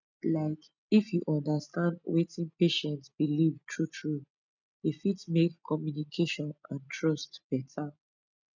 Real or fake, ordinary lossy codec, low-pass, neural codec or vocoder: real; none; 7.2 kHz; none